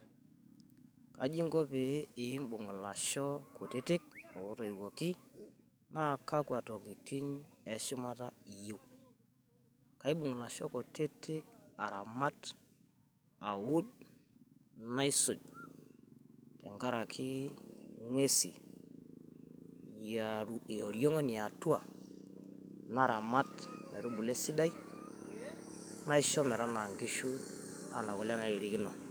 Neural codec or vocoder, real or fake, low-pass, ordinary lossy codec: codec, 44.1 kHz, 7.8 kbps, DAC; fake; none; none